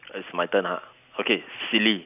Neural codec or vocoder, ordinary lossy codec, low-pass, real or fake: none; none; 3.6 kHz; real